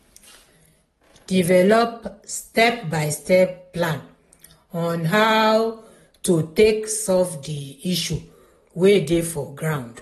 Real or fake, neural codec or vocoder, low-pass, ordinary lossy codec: real; none; 19.8 kHz; AAC, 32 kbps